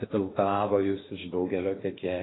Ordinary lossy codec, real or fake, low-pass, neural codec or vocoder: AAC, 16 kbps; fake; 7.2 kHz; codec, 16 kHz in and 24 kHz out, 0.8 kbps, FocalCodec, streaming, 65536 codes